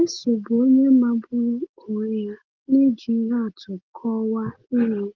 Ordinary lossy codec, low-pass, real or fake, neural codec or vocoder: Opus, 16 kbps; 7.2 kHz; real; none